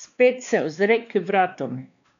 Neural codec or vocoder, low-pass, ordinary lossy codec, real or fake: codec, 16 kHz, 2 kbps, X-Codec, WavLM features, trained on Multilingual LibriSpeech; 7.2 kHz; none; fake